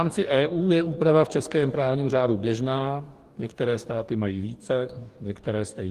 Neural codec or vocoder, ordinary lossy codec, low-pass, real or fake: codec, 44.1 kHz, 2.6 kbps, DAC; Opus, 24 kbps; 14.4 kHz; fake